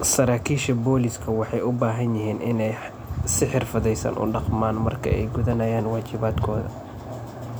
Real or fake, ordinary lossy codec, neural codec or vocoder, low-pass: real; none; none; none